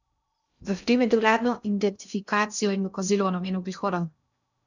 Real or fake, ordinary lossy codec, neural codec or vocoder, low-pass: fake; none; codec, 16 kHz in and 24 kHz out, 0.6 kbps, FocalCodec, streaming, 2048 codes; 7.2 kHz